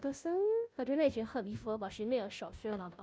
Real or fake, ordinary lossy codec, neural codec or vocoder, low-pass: fake; none; codec, 16 kHz, 0.5 kbps, FunCodec, trained on Chinese and English, 25 frames a second; none